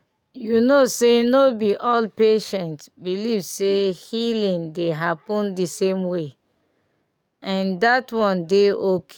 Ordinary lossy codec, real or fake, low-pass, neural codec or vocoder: none; fake; 19.8 kHz; codec, 44.1 kHz, 7.8 kbps, DAC